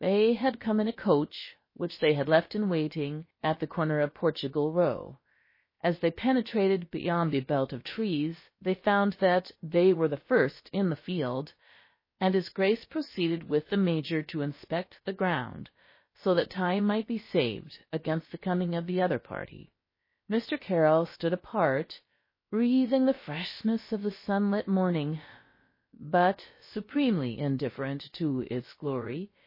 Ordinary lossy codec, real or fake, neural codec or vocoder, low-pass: MP3, 24 kbps; fake; codec, 16 kHz, about 1 kbps, DyCAST, with the encoder's durations; 5.4 kHz